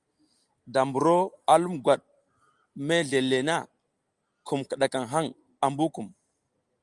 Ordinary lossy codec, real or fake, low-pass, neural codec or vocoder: Opus, 32 kbps; real; 10.8 kHz; none